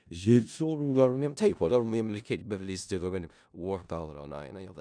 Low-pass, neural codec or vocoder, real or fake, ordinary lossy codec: 9.9 kHz; codec, 16 kHz in and 24 kHz out, 0.4 kbps, LongCat-Audio-Codec, four codebook decoder; fake; none